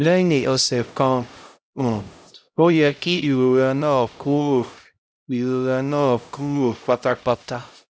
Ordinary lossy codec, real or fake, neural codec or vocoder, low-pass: none; fake; codec, 16 kHz, 0.5 kbps, X-Codec, HuBERT features, trained on LibriSpeech; none